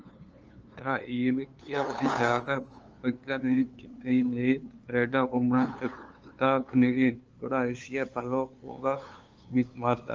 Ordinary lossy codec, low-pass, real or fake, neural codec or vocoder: Opus, 24 kbps; 7.2 kHz; fake; codec, 16 kHz, 2 kbps, FunCodec, trained on LibriTTS, 25 frames a second